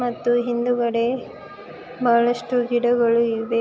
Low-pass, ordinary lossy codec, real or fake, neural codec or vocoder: none; none; real; none